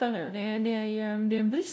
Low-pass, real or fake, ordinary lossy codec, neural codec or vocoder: none; fake; none; codec, 16 kHz, 0.5 kbps, FunCodec, trained on LibriTTS, 25 frames a second